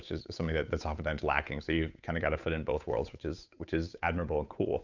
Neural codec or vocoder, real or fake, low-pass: codec, 16 kHz, 8 kbps, FunCodec, trained on Chinese and English, 25 frames a second; fake; 7.2 kHz